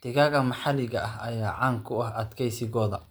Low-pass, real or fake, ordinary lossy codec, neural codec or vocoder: none; fake; none; vocoder, 44.1 kHz, 128 mel bands every 512 samples, BigVGAN v2